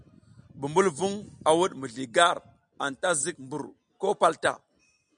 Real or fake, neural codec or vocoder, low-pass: real; none; 9.9 kHz